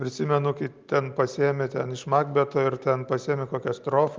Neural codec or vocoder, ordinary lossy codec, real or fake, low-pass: none; Opus, 24 kbps; real; 7.2 kHz